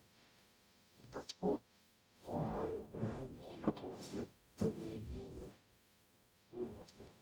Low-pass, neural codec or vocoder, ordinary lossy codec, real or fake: none; codec, 44.1 kHz, 0.9 kbps, DAC; none; fake